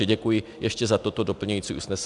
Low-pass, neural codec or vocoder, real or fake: 10.8 kHz; none; real